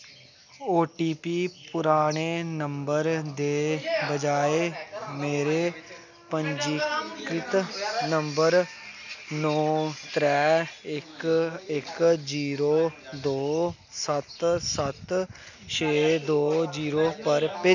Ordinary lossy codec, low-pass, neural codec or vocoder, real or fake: none; 7.2 kHz; none; real